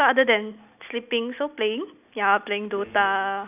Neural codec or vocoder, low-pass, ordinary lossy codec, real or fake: none; 3.6 kHz; none; real